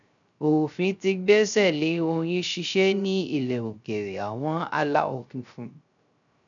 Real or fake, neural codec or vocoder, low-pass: fake; codec, 16 kHz, 0.3 kbps, FocalCodec; 7.2 kHz